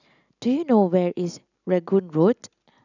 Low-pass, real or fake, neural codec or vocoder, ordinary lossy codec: 7.2 kHz; real; none; none